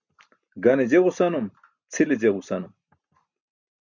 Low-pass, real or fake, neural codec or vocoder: 7.2 kHz; real; none